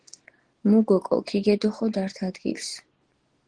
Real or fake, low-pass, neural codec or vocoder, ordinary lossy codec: real; 9.9 kHz; none; Opus, 16 kbps